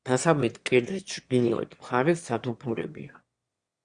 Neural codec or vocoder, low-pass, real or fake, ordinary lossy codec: autoencoder, 22.05 kHz, a latent of 192 numbers a frame, VITS, trained on one speaker; 9.9 kHz; fake; Opus, 64 kbps